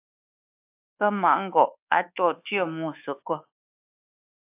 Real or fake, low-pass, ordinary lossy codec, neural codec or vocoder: fake; 3.6 kHz; AAC, 32 kbps; codec, 24 kHz, 1.2 kbps, DualCodec